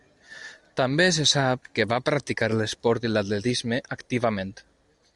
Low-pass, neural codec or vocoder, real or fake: 10.8 kHz; none; real